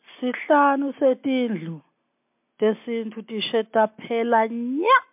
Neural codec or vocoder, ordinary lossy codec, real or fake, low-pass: none; MP3, 32 kbps; real; 3.6 kHz